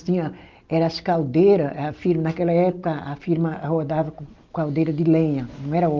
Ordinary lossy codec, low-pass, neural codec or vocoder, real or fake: Opus, 32 kbps; 7.2 kHz; none; real